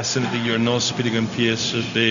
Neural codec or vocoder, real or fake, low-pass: codec, 16 kHz, 0.4 kbps, LongCat-Audio-Codec; fake; 7.2 kHz